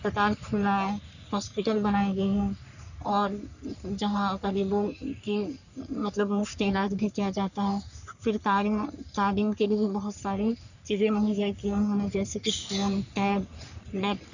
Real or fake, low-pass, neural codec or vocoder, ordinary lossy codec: fake; 7.2 kHz; codec, 44.1 kHz, 3.4 kbps, Pupu-Codec; none